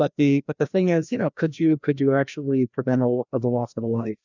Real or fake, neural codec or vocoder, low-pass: fake; codec, 16 kHz, 1 kbps, FreqCodec, larger model; 7.2 kHz